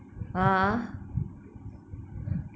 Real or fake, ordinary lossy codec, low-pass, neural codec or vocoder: real; none; none; none